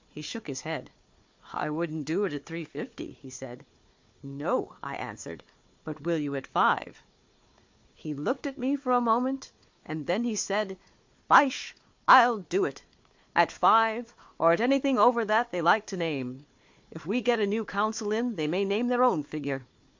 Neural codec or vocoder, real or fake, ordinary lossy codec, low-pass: codec, 16 kHz, 4 kbps, FunCodec, trained on Chinese and English, 50 frames a second; fake; MP3, 48 kbps; 7.2 kHz